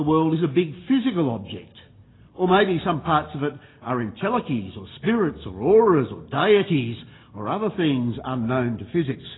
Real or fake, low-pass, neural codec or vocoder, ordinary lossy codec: real; 7.2 kHz; none; AAC, 16 kbps